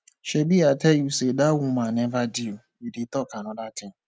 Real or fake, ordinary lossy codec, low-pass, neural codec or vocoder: real; none; none; none